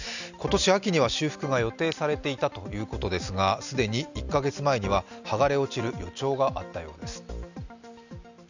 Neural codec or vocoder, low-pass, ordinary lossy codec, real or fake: none; 7.2 kHz; none; real